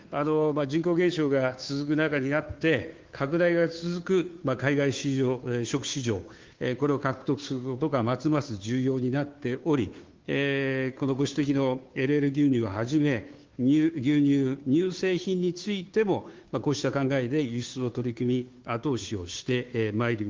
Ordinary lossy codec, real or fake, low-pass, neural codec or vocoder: Opus, 32 kbps; fake; 7.2 kHz; codec, 16 kHz, 2 kbps, FunCodec, trained on Chinese and English, 25 frames a second